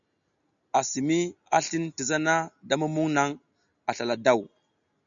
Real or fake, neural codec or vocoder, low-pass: real; none; 7.2 kHz